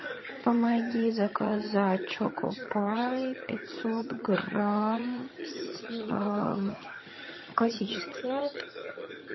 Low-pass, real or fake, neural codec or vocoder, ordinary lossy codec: 7.2 kHz; fake; vocoder, 22.05 kHz, 80 mel bands, HiFi-GAN; MP3, 24 kbps